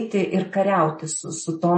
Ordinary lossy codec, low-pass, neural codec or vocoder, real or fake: MP3, 32 kbps; 10.8 kHz; none; real